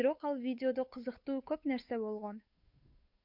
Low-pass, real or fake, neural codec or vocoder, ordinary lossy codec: 5.4 kHz; fake; codec, 16 kHz, 8 kbps, FunCodec, trained on Chinese and English, 25 frames a second; MP3, 48 kbps